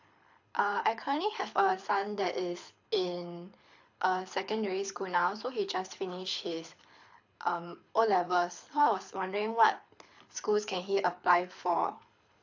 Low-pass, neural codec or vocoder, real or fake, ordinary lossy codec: 7.2 kHz; codec, 24 kHz, 6 kbps, HILCodec; fake; AAC, 48 kbps